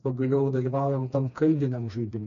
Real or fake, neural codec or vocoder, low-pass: fake; codec, 16 kHz, 2 kbps, FreqCodec, smaller model; 7.2 kHz